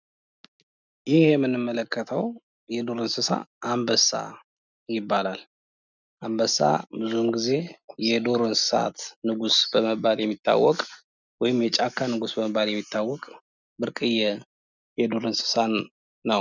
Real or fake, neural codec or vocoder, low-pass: real; none; 7.2 kHz